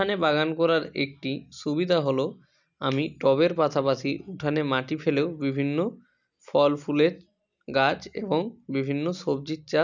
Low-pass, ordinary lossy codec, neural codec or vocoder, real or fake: 7.2 kHz; none; none; real